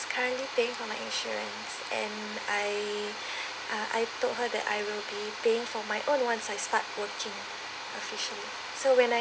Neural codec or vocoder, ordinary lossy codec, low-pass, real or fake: none; none; none; real